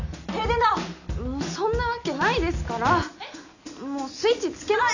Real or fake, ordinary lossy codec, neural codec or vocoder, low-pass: real; none; none; 7.2 kHz